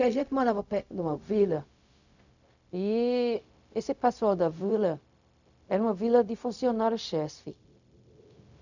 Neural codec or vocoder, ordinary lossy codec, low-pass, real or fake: codec, 16 kHz, 0.4 kbps, LongCat-Audio-Codec; none; 7.2 kHz; fake